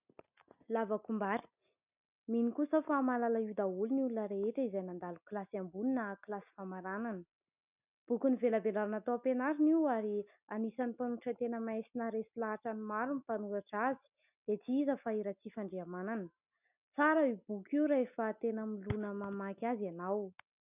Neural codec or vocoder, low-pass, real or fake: none; 3.6 kHz; real